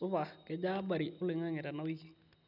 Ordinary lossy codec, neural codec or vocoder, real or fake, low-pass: none; none; real; 5.4 kHz